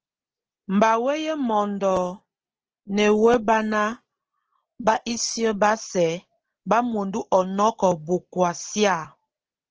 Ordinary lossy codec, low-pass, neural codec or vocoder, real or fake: Opus, 16 kbps; 7.2 kHz; none; real